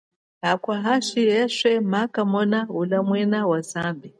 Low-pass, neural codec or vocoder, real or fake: 9.9 kHz; none; real